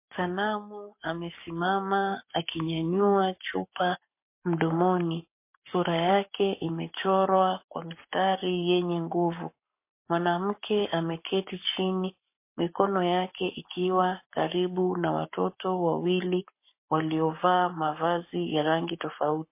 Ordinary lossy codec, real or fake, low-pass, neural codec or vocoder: MP3, 24 kbps; fake; 3.6 kHz; codec, 44.1 kHz, 7.8 kbps, Pupu-Codec